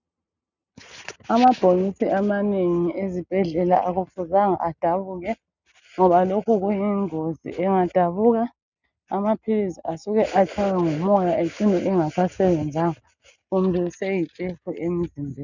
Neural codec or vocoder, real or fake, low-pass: none; real; 7.2 kHz